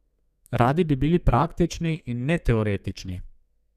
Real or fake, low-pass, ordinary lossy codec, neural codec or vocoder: fake; 14.4 kHz; none; codec, 32 kHz, 1.9 kbps, SNAC